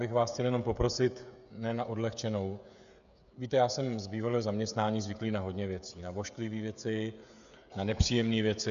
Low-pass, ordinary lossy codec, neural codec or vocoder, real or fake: 7.2 kHz; MP3, 96 kbps; codec, 16 kHz, 16 kbps, FreqCodec, smaller model; fake